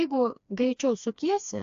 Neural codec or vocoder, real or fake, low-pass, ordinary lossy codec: codec, 16 kHz, 2 kbps, FreqCodec, smaller model; fake; 7.2 kHz; AAC, 64 kbps